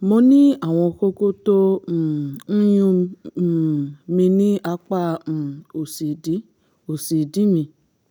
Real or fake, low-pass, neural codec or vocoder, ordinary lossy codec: real; none; none; none